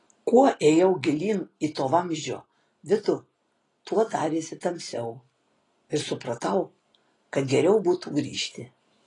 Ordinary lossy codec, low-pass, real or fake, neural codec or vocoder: AAC, 32 kbps; 10.8 kHz; real; none